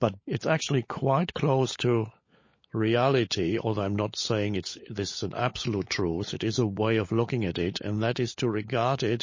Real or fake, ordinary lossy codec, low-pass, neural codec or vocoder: fake; MP3, 32 kbps; 7.2 kHz; codec, 16 kHz, 16 kbps, FunCodec, trained on LibriTTS, 50 frames a second